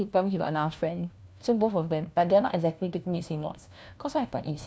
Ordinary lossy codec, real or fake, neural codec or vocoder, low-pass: none; fake; codec, 16 kHz, 1 kbps, FunCodec, trained on LibriTTS, 50 frames a second; none